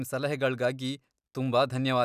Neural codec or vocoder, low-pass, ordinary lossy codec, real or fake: none; 14.4 kHz; none; real